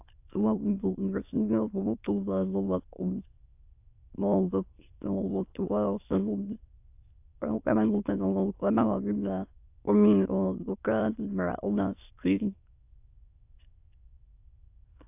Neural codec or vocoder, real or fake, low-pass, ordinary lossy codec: autoencoder, 22.05 kHz, a latent of 192 numbers a frame, VITS, trained on many speakers; fake; 3.6 kHz; AAC, 32 kbps